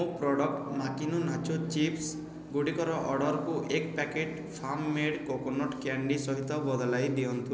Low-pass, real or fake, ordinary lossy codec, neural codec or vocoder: none; real; none; none